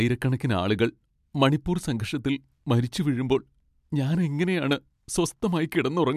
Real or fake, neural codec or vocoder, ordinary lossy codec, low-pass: real; none; MP3, 96 kbps; 14.4 kHz